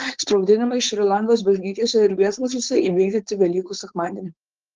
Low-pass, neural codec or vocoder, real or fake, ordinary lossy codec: 7.2 kHz; codec, 16 kHz, 4.8 kbps, FACodec; fake; Opus, 16 kbps